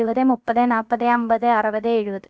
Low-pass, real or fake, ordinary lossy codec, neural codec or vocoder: none; fake; none; codec, 16 kHz, about 1 kbps, DyCAST, with the encoder's durations